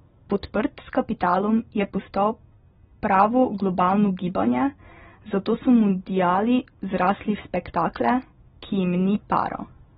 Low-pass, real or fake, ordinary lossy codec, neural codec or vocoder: 7.2 kHz; real; AAC, 16 kbps; none